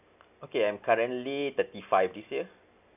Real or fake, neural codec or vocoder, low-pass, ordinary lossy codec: real; none; 3.6 kHz; none